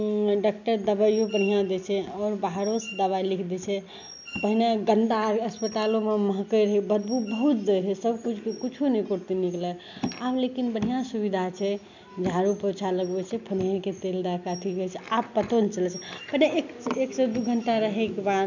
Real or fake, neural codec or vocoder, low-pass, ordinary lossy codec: real; none; 7.2 kHz; none